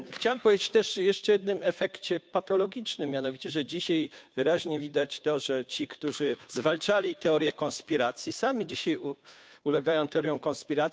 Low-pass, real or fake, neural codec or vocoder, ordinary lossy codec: none; fake; codec, 16 kHz, 2 kbps, FunCodec, trained on Chinese and English, 25 frames a second; none